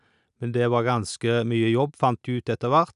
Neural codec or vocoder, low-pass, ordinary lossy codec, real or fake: none; 10.8 kHz; none; real